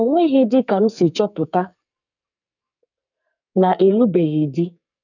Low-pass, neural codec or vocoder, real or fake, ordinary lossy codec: 7.2 kHz; codec, 44.1 kHz, 2.6 kbps, SNAC; fake; none